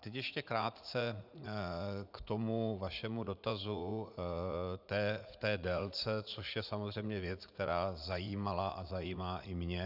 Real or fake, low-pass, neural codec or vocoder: fake; 5.4 kHz; vocoder, 44.1 kHz, 80 mel bands, Vocos